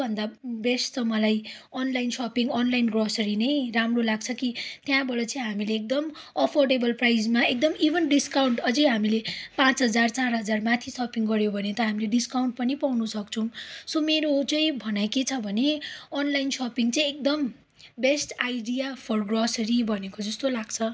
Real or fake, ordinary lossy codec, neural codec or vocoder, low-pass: real; none; none; none